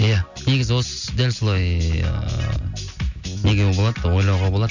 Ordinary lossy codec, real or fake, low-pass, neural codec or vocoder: none; real; 7.2 kHz; none